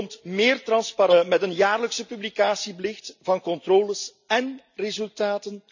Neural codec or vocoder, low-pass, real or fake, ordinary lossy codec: none; 7.2 kHz; real; none